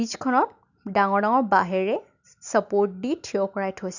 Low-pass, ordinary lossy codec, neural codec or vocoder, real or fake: 7.2 kHz; none; none; real